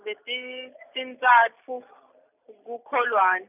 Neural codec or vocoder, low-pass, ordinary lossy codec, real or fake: none; 3.6 kHz; none; real